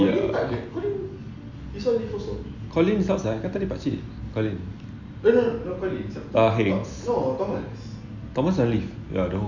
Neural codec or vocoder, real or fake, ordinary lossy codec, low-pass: none; real; none; 7.2 kHz